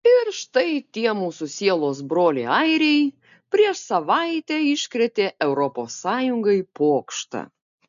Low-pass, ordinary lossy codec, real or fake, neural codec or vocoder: 7.2 kHz; MP3, 96 kbps; real; none